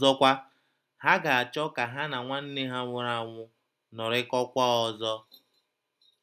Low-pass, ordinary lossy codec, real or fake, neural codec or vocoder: 14.4 kHz; none; real; none